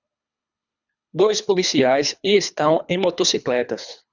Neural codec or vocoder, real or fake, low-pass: codec, 24 kHz, 3 kbps, HILCodec; fake; 7.2 kHz